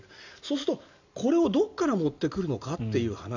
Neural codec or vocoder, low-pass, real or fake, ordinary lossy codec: none; 7.2 kHz; real; AAC, 48 kbps